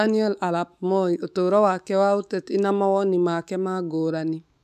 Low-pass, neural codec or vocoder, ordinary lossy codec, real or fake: 14.4 kHz; autoencoder, 48 kHz, 128 numbers a frame, DAC-VAE, trained on Japanese speech; none; fake